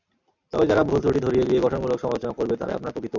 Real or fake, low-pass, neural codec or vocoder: real; 7.2 kHz; none